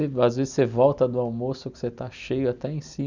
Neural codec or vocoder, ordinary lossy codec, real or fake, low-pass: none; none; real; 7.2 kHz